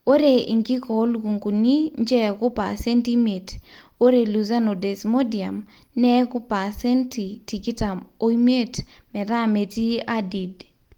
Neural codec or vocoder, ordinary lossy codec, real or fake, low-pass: none; Opus, 24 kbps; real; 19.8 kHz